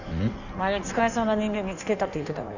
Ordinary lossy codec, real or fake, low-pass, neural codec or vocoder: none; fake; 7.2 kHz; codec, 16 kHz in and 24 kHz out, 1.1 kbps, FireRedTTS-2 codec